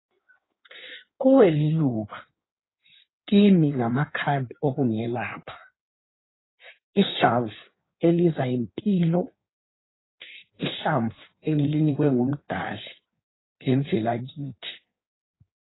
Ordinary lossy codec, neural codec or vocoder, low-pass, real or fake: AAC, 16 kbps; codec, 16 kHz in and 24 kHz out, 1.1 kbps, FireRedTTS-2 codec; 7.2 kHz; fake